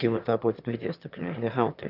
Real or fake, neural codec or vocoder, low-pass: fake; autoencoder, 22.05 kHz, a latent of 192 numbers a frame, VITS, trained on one speaker; 5.4 kHz